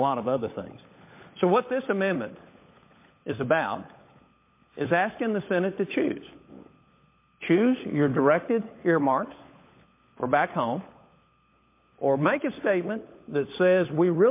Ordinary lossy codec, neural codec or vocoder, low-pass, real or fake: MP3, 24 kbps; codec, 16 kHz, 16 kbps, FunCodec, trained on LibriTTS, 50 frames a second; 3.6 kHz; fake